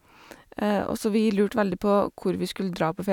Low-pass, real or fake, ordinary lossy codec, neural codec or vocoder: 19.8 kHz; real; none; none